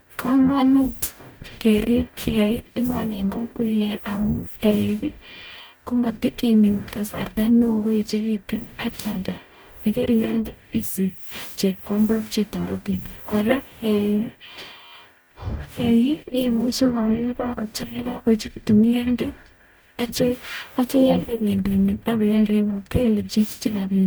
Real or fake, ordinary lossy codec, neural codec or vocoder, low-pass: fake; none; codec, 44.1 kHz, 0.9 kbps, DAC; none